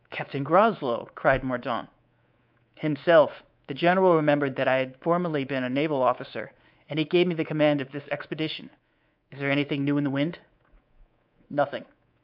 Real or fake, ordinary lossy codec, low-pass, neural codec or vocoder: fake; AAC, 48 kbps; 5.4 kHz; codec, 24 kHz, 3.1 kbps, DualCodec